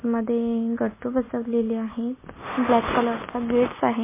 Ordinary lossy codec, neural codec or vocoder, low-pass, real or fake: MP3, 16 kbps; none; 3.6 kHz; real